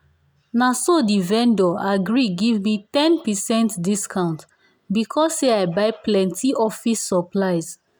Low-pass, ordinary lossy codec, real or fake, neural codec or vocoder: none; none; real; none